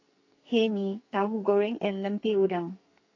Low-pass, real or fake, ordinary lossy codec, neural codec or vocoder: 7.2 kHz; fake; AAC, 32 kbps; codec, 44.1 kHz, 2.6 kbps, SNAC